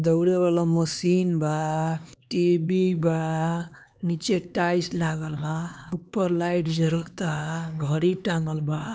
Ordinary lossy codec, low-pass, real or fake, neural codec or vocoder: none; none; fake; codec, 16 kHz, 2 kbps, X-Codec, HuBERT features, trained on LibriSpeech